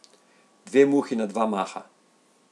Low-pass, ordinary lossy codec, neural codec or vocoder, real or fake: none; none; none; real